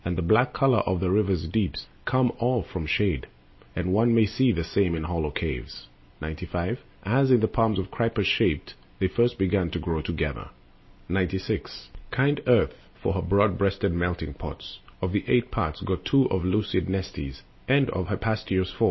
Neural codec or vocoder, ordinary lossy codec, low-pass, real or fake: none; MP3, 24 kbps; 7.2 kHz; real